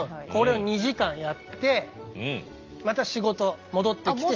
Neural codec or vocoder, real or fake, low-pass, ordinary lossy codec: none; real; 7.2 kHz; Opus, 32 kbps